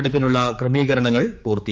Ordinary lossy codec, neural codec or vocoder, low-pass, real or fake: none; codec, 16 kHz, 4 kbps, X-Codec, HuBERT features, trained on general audio; none; fake